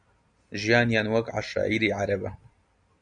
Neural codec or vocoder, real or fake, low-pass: none; real; 9.9 kHz